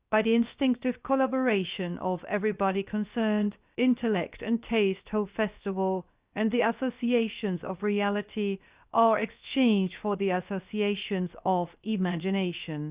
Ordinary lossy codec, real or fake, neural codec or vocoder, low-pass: Opus, 64 kbps; fake; codec, 16 kHz, about 1 kbps, DyCAST, with the encoder's durations; 3.6 kHz